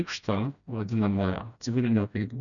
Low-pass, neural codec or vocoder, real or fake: 7.2 kHz; codec, 16 kHz, 1 kbps, FreqCodec, smaller model; fake